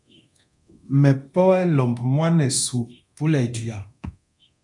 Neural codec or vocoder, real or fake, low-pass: codec, 24 kHz, 0.9 kbps, DualCodec; fake; 10.8 kHz